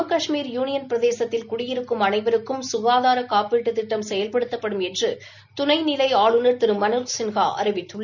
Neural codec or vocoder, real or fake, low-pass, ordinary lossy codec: none; real; 7.2 kHz; none